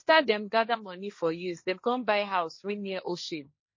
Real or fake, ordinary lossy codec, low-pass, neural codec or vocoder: fake; MP3, 32 kbps; 7.2 kHz; codec, 16 kHz, 1.1 kbps, Voila-Tokenizer